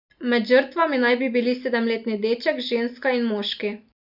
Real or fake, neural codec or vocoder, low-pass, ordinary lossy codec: real; none; 5.4 kHz; none